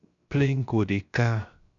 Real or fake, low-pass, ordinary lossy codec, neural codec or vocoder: fake; 7.2 kHz; MP3, 96 kbps; codec, 16 kHz, 0.3 kbps, FocalCodec